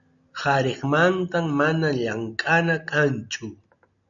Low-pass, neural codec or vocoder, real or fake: 7.2 kHz; none; real